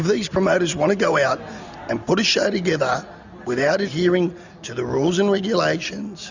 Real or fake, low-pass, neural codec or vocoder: real; 7.2 kHz; none